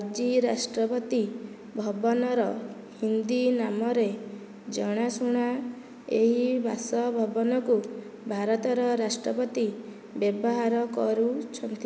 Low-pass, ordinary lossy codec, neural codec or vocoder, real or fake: none; none; none; real